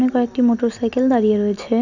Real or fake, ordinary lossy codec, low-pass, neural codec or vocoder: real; none; 7.2 kHz; none